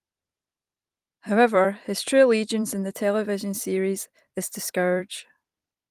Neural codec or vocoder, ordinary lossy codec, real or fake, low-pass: vocoder, 44.1 kHz, 128 mel bands every 256 samples, BigVGAN v2; Opus, 32 kbps; fake; 14.4 kHz